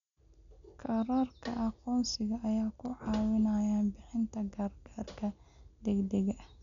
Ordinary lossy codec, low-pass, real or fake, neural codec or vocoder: none; 7.2 kHz; real; none